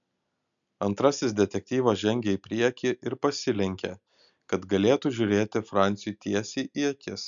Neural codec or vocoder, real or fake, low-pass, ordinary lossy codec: none; real; 7.2 kHz; MP3, 96 kbps